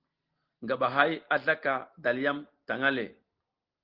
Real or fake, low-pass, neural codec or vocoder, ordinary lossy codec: real; 5.4 kHz; none; Opus, 16 kbps